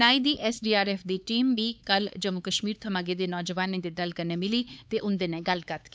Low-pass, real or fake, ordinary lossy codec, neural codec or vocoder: none; fake; none; codec, 16 kHz, 4 kbps, X-Codec, HuBERT features, trained on LibriSpeech